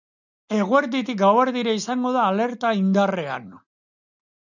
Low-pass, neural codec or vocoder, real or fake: 7.2 kHz; none; real